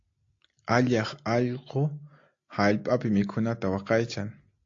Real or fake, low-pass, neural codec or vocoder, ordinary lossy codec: real; 7.2 kHz; none; AAC, 48 kbps